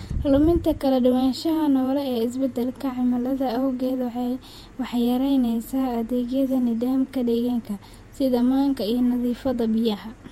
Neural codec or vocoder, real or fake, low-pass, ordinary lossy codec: vocoder, 48 kHz, 128 mel bands, Vocos; fake; 19.8 kHz; MP3, 64 kbps